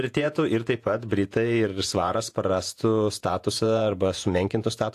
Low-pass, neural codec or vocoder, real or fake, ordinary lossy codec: 14.4 kHz; vocoder, 48 kHz, 128 mel bands, Vocos; fake; AAC, 64 kbps